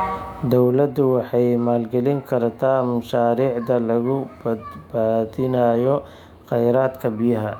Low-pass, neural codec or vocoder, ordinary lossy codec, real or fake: 19.8 kHz; autoencoder, 48 kHz, 128 numbers a frame, DAC-VAE, trained on Japanese speech; none; fake